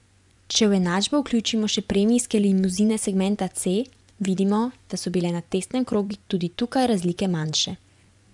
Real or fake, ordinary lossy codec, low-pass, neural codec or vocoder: fake; none; 10.8 kHz; vocoder, 24 kHz, 100 mel bands, Vocos